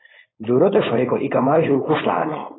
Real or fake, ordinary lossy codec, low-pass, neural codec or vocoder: fake; AAC, 16 kbps; 7.2 kHz; codec, 16 kHz, 4.8 kbps, FACodec